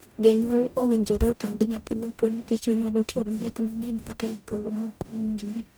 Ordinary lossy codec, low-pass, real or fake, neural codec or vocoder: none; none; fake; codec, 44.1 kHz, 0.9 kbps, DAC